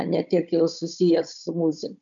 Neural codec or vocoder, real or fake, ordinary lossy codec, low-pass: codec, 16 kHz, 16 kbps, FunCodec, trained on Chinese and English, 50 frames a second; fake; MP3, 96 kbps; 7.2 kHz